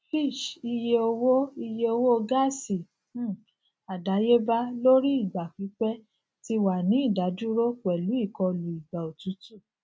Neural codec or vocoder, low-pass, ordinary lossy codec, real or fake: none; none; none; real